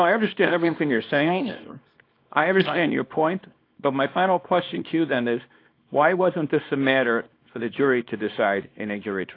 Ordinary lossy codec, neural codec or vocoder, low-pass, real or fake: AAC, 32 kbps; codec, 24 kHz, 0.9 kbps, WavTokenizer, small release; 5.4 kHz; fake